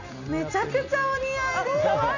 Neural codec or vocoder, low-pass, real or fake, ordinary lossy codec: none; 7.2 kHz; real; none